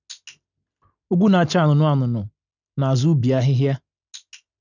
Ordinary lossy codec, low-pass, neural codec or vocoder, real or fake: none; 7.2 kHz; none; real